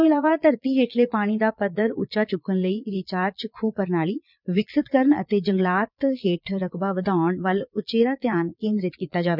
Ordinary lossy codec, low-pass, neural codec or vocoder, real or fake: none; 5.4 kHz; vocoder, 22.05 kHz, 80 mel bands, Vocos; fake